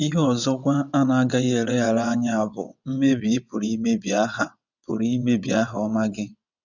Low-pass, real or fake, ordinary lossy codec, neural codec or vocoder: 7.2 kHz; fake; none; vocoder, 22.05 kHz, 80 mel bands, WaveNeXt